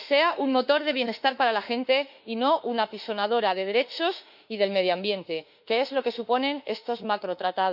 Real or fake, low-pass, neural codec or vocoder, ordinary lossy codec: fake; 5.4 kHz; autoencoder, 48 kHz, 32 numbers a frame, DAC-VAE, trained on Japanese speech; none